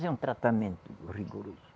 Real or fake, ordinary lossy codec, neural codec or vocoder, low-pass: real; none; none; none